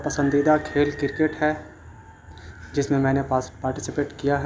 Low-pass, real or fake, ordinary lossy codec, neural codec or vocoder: none; real; none; none